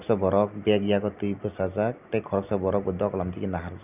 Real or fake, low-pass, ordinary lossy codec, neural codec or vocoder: real; 3.6 kHz; none; none